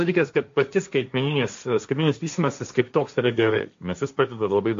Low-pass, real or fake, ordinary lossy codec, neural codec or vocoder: 7.2 kHz; fake; MP3, 48 kbps; codec, 16 kHz, 1.1 kbps, Voila-Tokenizer